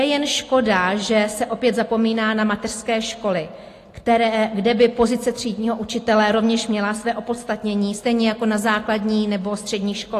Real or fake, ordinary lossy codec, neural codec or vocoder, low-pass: real; AAC, 48 kbps; none; 14.4 kHz